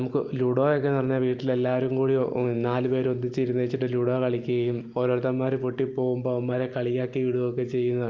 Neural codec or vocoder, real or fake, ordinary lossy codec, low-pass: none; real; Opus, 32 kbps; 7.2 kHz